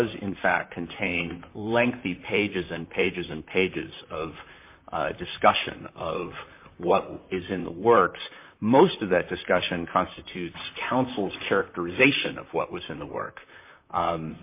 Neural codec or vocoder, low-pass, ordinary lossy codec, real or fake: vocoder, 44.1 kHz, 128 mel bands, Pupu-Vocoder; 3.6 kHz; MP3, 24 kbps; fake